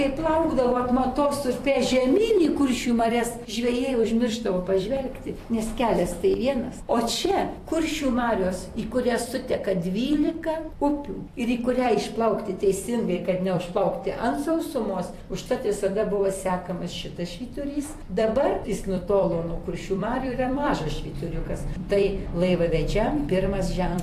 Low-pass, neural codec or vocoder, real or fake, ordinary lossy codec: 14.4 kHz; vocoder, 44.1 kHz, 128 mel bands every 256 samples, BigVGAN v2; fake; AAC, 64 kbps